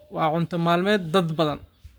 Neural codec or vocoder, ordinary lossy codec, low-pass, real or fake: codec, 44.1 kHz, 7.8 kbps, Pupu-Codec; none; none; fake